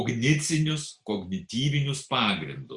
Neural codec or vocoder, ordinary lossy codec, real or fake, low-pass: none; Opus, 64 kbps; real; 10.8 kHz